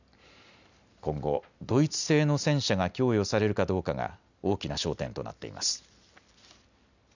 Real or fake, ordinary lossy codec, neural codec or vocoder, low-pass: real; none; none; 7.2 kHz